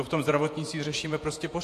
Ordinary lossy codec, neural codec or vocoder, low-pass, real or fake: AAC, 64 kbps; vocoder, 48 kHz, 128 mel bands, Vocos; 14.4 kHz; fake